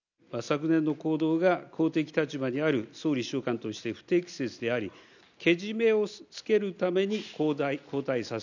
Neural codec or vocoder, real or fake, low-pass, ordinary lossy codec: none; real; 7.2 kHz; none